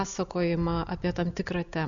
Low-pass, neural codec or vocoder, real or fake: 7.2 kHz; none; real